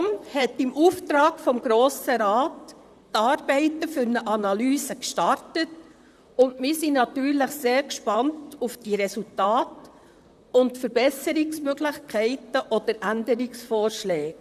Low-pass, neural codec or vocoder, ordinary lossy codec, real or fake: 14.4 kHz; vocoder, 44.1 kHz, 128 mel bands, Pupu-Vocoder; none; fake